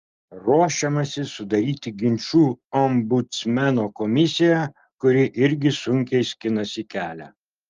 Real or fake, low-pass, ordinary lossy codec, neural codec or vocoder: real; 7.2 kHz; Opus, 32 kbps; none